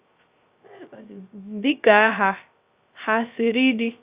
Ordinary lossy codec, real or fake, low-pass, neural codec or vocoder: Opus, 64 kbps; fake; 3.6 kHz; codec, 16 kHz, 0.3 kbps, FocalCodec